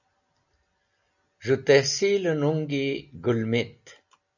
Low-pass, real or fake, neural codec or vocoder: 7.2 kHz; real; none